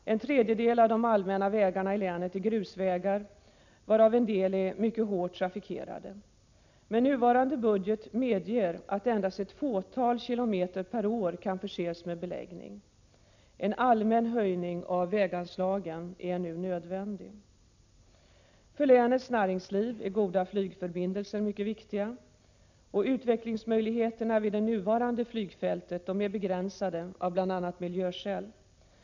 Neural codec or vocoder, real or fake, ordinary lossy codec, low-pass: none; real; none; 7.2 kHz